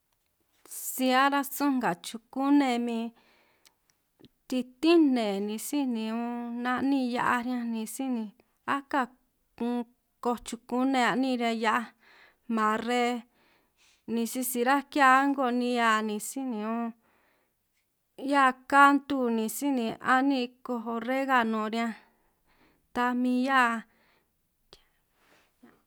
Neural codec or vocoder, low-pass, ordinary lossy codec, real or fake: none; none; none; real